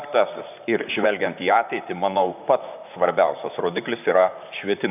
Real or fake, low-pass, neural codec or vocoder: fake; 3.6 kHz; vocoder, 44.1 kHz, 128 mel bands, Pupu-Vocoder